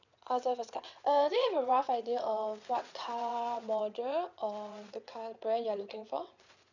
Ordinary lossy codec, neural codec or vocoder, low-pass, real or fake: none; vocoder, 22.05 kHz, 80 mel bands, WaveNeXt; 7.2 kHz; fake